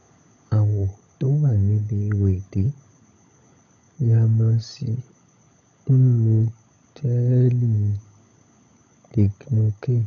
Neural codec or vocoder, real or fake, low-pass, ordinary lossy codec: codec, 16 kHz, 16 kbps, FunCodec, trained on LibriTTS, 50 frames a second; fake; 7.2 kHz; none